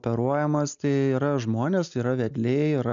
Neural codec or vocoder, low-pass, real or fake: none; 7.2 kHz; real